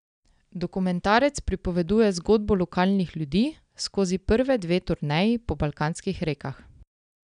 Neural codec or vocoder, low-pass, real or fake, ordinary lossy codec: none; 9.9 kHz; real; none